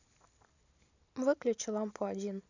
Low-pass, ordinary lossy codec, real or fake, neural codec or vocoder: 7.2 kHz; none; real; none